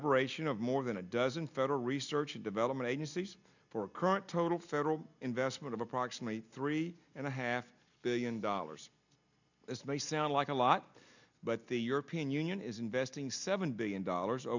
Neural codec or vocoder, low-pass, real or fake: none; 7.2 kHz; real